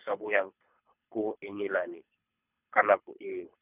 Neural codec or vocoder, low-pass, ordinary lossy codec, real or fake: codec, 24 kHz, 3 kbps, HILCodec; 3.6 kHz; none; fake